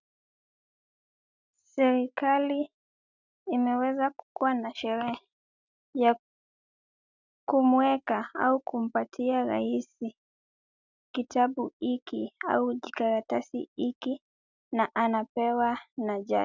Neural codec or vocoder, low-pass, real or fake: none; 7.2 kHz; real